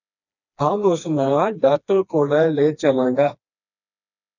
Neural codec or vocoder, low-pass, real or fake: codec, 16 kHz, 2 kbps, FreqCodec, smaller model; 7.2 kHz; fake